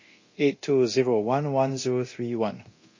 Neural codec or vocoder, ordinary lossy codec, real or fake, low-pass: codec, 24 kHz, 0.9 kbps, DualCodec; MP3, 32 kbps; fake; 7.2 kHz